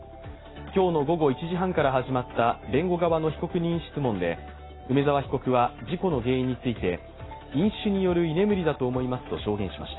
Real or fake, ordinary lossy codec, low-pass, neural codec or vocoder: real; AAC, 16 kbps; 7.2 kHz; none